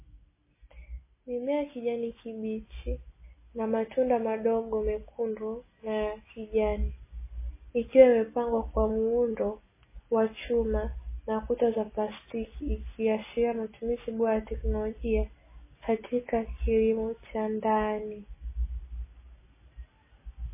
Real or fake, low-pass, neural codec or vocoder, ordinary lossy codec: real; 3.6 kHz; none; MP3, 16 kbps